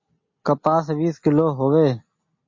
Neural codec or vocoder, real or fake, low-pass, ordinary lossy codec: none; real; 7.2 kHz; MP3, 32 kbps